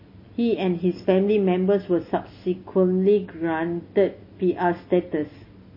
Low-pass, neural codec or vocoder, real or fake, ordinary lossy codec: 5.4 kHz; none; real; MP3, 24 kbps